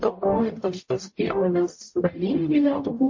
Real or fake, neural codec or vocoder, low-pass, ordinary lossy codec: fake; codec, 44.1 kHz, 0.9 kbps, DAC; 7.2 kHz; MP3, 32 kbps